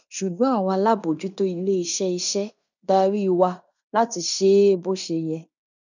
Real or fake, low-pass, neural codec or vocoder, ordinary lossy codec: fake; 7.2 kHz; codec, 16 kHz in and 24 kHz out, 0.9 kbps, LongCat-Audio-Codec, fine tuned four codebook decoder; none